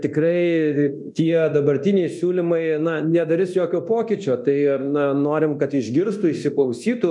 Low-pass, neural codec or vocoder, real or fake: 10.8 kHz; codec, 24 kHz, 0.9 kbps, DualCodec; fake